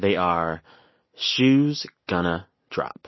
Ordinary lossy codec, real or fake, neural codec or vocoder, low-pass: MP3, 24 kbps; real; none; 7.2 kHz